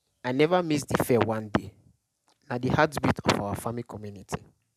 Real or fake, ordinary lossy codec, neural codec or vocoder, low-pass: real; none; none; 14.4 kHz